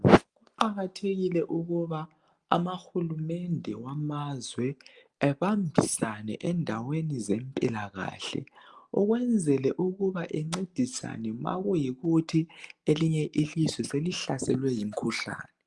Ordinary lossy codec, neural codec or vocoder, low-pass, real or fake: Opus, 32 kbps; none; 10.8 kHz; real